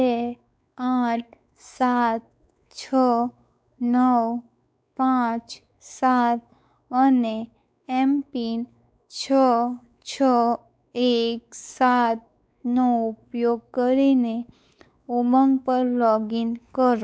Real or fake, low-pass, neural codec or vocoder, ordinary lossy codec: fake; none; codec, 16 kHz, 4 kbps, X-Codec, WavLM features, trained on Multilingual LibriSpeech; none